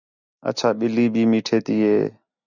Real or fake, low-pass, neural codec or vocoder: real; 7.2 kHz; none